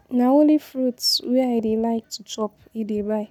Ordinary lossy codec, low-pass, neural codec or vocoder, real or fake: none; 19.8 kHz; none; real